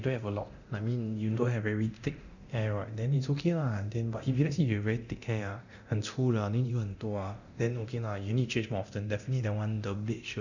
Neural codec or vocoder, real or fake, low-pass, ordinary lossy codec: codec, 24 kHz, 0.9 kbps, DualCodec; fake; 7.2 kHz; none